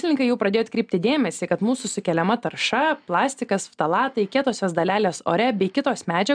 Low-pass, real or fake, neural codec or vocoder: 9.9 kHz; real; none